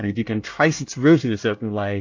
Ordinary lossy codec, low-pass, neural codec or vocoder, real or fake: AAC, 48 kbps; 7.2 kHz; codec, 24 kHz, 1 kbps, SNAC; fake